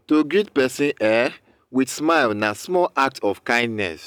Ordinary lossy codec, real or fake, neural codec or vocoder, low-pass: none; real; none; none